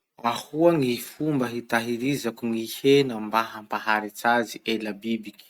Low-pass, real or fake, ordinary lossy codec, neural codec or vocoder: 19.8 kHz; real; Opus, 64 kbps; none